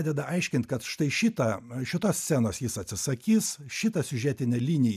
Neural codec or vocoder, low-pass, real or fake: none; 14.4 kHz; real